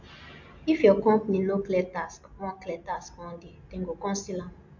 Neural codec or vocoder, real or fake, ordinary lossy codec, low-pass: none; real; MP3, 48 kbps; 7.2 kHz